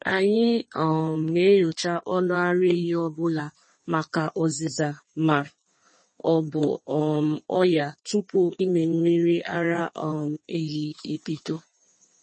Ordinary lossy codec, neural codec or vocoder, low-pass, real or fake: MP3, 32 kbps; codec, 16 kHz in and 24 kHz out, 1.1 kbps, FireRedTTS-2 codec; 9.9 kHz; fake